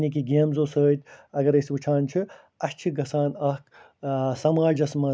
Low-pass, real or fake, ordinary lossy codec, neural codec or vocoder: none; real; none; none